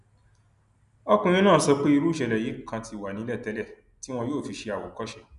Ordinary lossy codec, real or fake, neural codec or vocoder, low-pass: none; real; none; 10.8 kHz